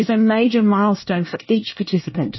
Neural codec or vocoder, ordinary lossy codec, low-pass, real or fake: codec, 24 kHz, 1 kbps, SNAC; MP3, 24 kbps; 7.2 kHz; fake